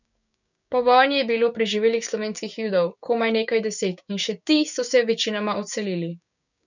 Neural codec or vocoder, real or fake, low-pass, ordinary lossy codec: codec, 16 kHz, 6 kbps, DAC; fake; 7.2 kHz; none